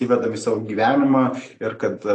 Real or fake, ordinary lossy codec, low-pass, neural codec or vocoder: real; AAC, 48 kbps; 10.8 kHz; none